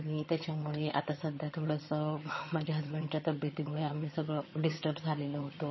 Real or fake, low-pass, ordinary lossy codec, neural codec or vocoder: fake; 7.2 kHz; MP3, 24 kbps; vocoder, 22.05 kHz, 80 mel bands, HiFi-GAN